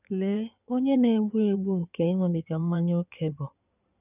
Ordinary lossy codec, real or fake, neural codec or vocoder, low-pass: none; fake; codec, 16 kHz in and 24 kHz out, 2.2 kbps, FireRedTTS-2 codec; 3.6 kHz